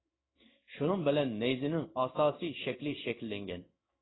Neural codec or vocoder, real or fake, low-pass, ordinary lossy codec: codec, 16 kHz in and 24 kHz out, 1 kbps, XY-Tokenizer; fake; 7.2 kHz; AAC, 16 kbps